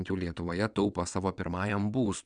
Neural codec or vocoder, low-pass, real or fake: vocoder, 22.05 kHz, 80 mel bands, WaveNeXt; 9.9 kHz; fake